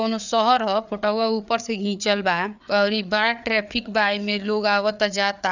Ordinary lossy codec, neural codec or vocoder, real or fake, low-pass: none; codec, 16 kHz, 4 kbps, FreqCodec, larger model; fake; 7.2 kHz